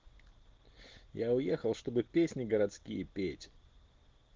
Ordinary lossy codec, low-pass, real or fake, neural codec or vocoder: Opus, 16 kbps; 7.2 kHz; real; none